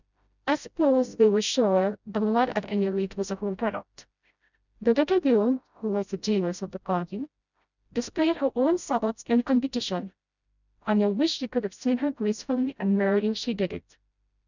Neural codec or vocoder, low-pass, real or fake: codec, 16 kHz, 0.5 kbps, FreqCodec, smaller model; 7.2 kHz; fake